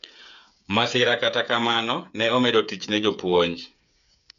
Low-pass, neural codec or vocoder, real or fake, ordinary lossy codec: 7.2 kHz; codec, 16 kHz, 8 kbps, FreqCodec, smaller model; fake; MP3, 96 kbps